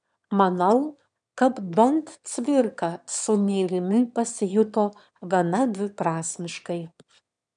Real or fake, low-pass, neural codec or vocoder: fake; 9.9 kHz; autoencoder, 22.05 kHz, a latent of 192 numbers a frame, VITS, trained on one speaker